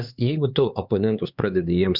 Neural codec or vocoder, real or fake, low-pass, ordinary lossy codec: codec, 16 kHz, 4 kbps, X-Codec, HuBERT features, trained on LibriSpeech; fake; 5.4 kHz; Opus, 64 kbps